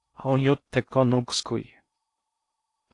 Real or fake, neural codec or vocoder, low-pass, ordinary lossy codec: fake; codec, 16 kHz in and 24 kHz out, 0.6 kbps, FocalCodec, streaming, 4096 codes; 10.8 kHz; AAC, 48 kbps